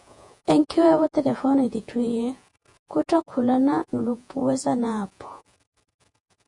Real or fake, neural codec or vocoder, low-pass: fake; vocoder, 48 kHz, 128 mel bands, Vocos; 10.8 kHz